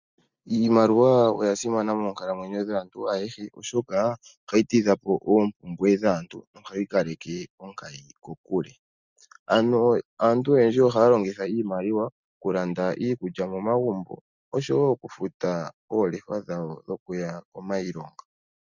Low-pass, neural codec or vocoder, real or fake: 7.2 kHz; none; real